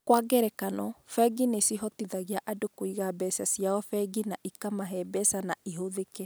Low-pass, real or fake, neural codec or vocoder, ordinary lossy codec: none; real; none; none